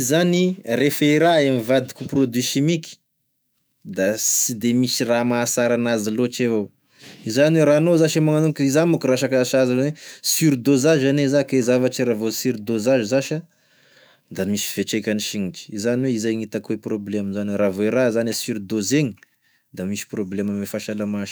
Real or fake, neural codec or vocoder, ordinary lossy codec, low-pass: fake; autoencoder, 48 kHz, 128 numbers a frame, DAC-VAE, trained on Japanese speech; none; none